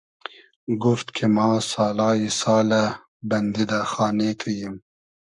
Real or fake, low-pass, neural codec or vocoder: fake; 10.8 kHz; codec, 44.1 kHz, 7.8 kbps, Pupu-Codec